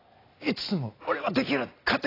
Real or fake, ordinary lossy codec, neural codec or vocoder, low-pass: real; AAC, 24 kbps; none; 5.4 kHz